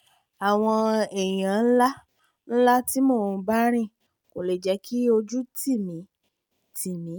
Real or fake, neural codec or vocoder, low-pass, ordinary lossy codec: real; none; none; none